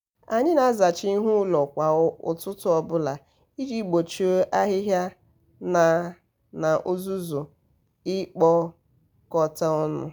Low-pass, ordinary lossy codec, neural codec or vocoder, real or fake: none; none; none; real